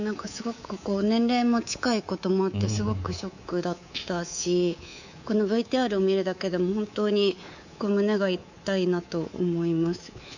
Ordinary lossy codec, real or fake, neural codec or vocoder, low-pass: none; fake; codec, 24 kHz, 3.1 kbps, DualCodec; 7.2 kHz